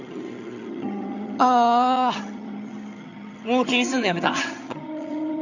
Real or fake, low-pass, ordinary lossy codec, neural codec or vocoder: fake; 7.2 kHz; none; vocoder, 22.05 kHz, 80 mel bands, HiFi-GAN